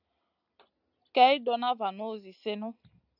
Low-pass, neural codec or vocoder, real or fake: 5.4 kHz; none; real